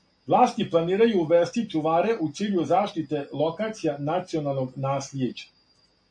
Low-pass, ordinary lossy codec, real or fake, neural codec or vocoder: 9.9 kHz; MP3, 48 kbps; real; none